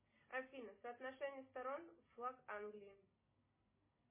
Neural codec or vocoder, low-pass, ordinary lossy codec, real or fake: none; 3.6 kHz; MP3, 16 kbps; real